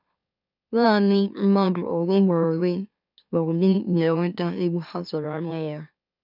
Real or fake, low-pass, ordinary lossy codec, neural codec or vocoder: fake; 5.4 kHz; none; autoencoder, 44.1 kHz, a latent of 192 numbers a frame, MeloTTS